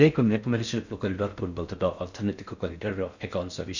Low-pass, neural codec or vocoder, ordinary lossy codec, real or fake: 7.2 kHz; codec, 16 kHz in and 24 kHz out, 0.6 kbps, FocalCodec, streaming, 4096 codes; none; fake